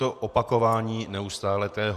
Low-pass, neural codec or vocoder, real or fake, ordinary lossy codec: 14.4 kHz; vocoder, 48 kHz, 128 mel bands, Vocos; fake; Opus, 64 kbps